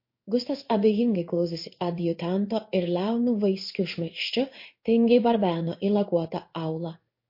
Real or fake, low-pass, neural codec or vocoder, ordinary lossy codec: fake; 5.4 kHz; codec, 16 kHz in and 24 kHz out, 1 kbps, XY-Tokenizer; MP3, 32 kbps